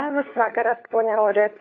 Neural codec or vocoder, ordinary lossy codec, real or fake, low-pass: codec, 16 kHz, 4 kbps, FunCodec, trained on LibriTTS, 50 frames a second; AAC, 32 kbps; fake; 7.2 kHz